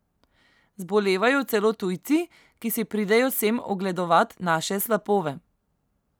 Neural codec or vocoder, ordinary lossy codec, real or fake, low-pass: none; none; real; none